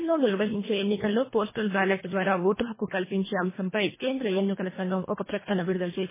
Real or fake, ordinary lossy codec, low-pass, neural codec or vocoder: fake; MP3, 16 kbps; 3.6 kHz; codec, 24 kHz, 1.5 kbps, HILCodec